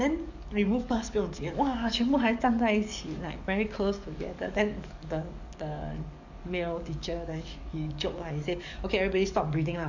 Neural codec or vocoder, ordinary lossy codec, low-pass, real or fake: codec, 44.1 kHz, 7.8 kbps, DAC; none; 7.2 kHz; fake